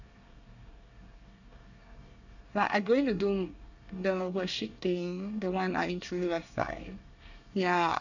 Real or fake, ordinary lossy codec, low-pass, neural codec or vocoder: fake; none; 7.2 kHz; codec, 24 kHz, 1 kbps, SNAC